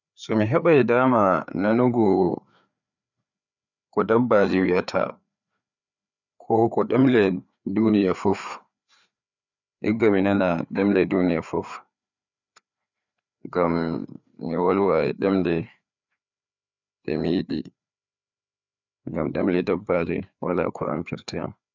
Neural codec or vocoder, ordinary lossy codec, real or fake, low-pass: codec, 16 kHz, 4 kbps, FreqCodec, larger model; none; fake; 7.2 kHz